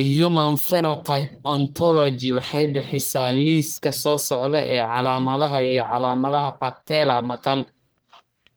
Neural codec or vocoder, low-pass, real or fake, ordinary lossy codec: codec, 44.1 kHz, 1.7 kbps, Pupu-Codec; none; fake; none